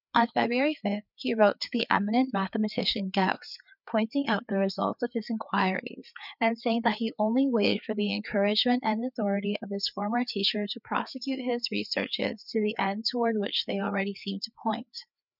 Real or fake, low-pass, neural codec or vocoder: fake; 5.4 kHz; codec, 16 kHz, 4 kbps, FreqCodec, larger model